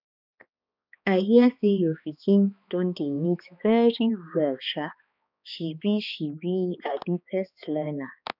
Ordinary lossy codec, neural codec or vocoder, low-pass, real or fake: none; codec, 16 kHz, 2 kbps, X-Codec, HuBERT features, trained on balanced general audio; 5.4 kHz; fake